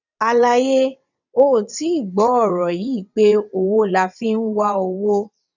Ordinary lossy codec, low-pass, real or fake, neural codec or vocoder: none; 7.2 kHz; fake; vocoder, 22.05 kHz, 80 mel bands, WaveNeXt